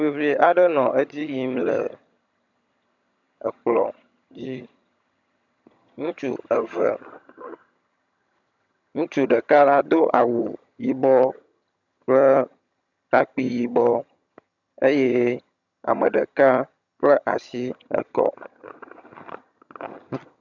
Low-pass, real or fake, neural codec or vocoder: 7.2 kHz; fake; vocoder, 22.05 kHz, 80 mel bands, HiFi-GAN